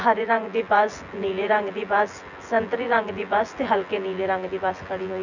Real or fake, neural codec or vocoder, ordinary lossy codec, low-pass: fake; vocoder, 24 kHz, 100 mel bands, Vocos; none; 7.2 kHz